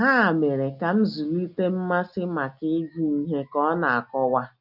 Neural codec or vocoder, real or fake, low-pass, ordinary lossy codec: none; real; 5.4 kHz; none